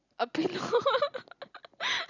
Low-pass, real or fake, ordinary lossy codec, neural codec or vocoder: 7.2 kHz; real; none; none